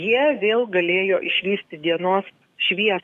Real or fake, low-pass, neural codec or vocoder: fake; 14.4 kHz; codec, 44.1 kHz, 7.8 kbps, DAC